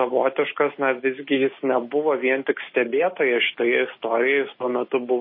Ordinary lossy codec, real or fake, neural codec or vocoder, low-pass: MP3, 24 kbps; real; none; 5.4 kHz